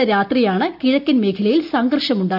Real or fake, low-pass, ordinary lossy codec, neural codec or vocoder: real; 5.4 kHz; none; none